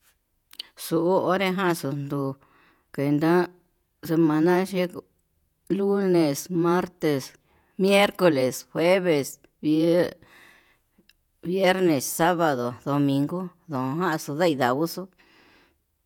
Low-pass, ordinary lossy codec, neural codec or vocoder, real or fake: 19.8 kHz; none; vocoder, 48 kHz, 128 mel bands, Vocos; fake